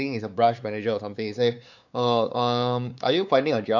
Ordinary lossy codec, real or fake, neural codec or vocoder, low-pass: none; fake; codec, 16 kHz, 4 kbps, X-Codec, WavLM features, trained on Multilingual LibriSpeech; 7.2 kHz